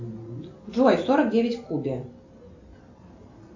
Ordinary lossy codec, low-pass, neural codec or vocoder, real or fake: AAC, 48 kbps; 7.2 kHz; none; real